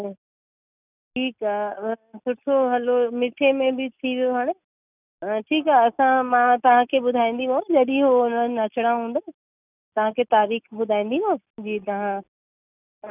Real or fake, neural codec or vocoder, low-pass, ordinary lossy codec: real; none; 3.6 kHz; none